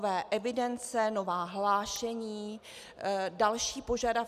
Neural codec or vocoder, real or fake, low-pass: none; real; 14.4 kHz